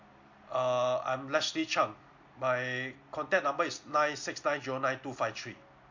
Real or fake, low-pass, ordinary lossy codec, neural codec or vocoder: real; 7.2 kHz; MP3, 48 kbps; none